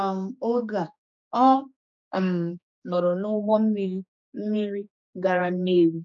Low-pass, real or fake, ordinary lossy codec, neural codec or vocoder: 7.2 kHz; fake; none; codec, 16 kHz, 2 kbps, X-Codec, HuBERT features, trained on general audio